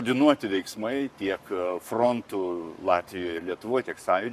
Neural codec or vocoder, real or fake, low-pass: codec, 44.1 kHz, 7.8 kbps, Pupu-Codec; fake; 14.4 kHz